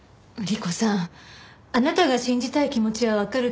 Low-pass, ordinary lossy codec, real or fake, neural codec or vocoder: none; none; real; none